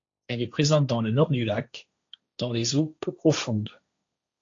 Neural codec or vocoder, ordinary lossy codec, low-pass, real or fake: codec, 16 kHz, 1.1 kbps, Voila-Tokenizer; AAC, 48 kbps; 7.2 kHz; fake